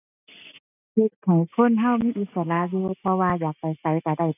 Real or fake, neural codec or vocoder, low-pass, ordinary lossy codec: real; none; 3.6 kHz; none